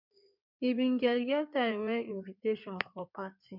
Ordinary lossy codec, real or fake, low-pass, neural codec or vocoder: MP3, 48 kbps; fake; 5.4 kHz; codec, 16 kHz in and 24 kHz out, 2.2 kbps, FireRedTTS-2 codec